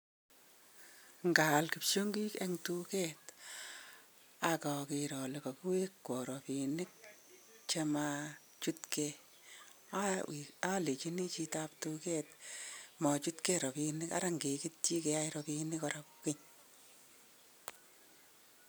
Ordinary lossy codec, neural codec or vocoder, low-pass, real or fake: none; none; none; real